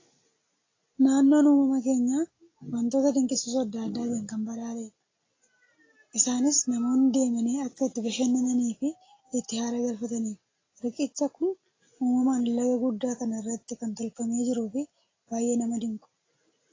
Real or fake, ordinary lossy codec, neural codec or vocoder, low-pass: real; AAC, 32 kbps; none; 7.2 kHz